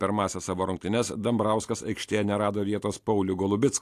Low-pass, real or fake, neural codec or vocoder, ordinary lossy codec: 14.4 kHz; real; none; AAC, 64 kbps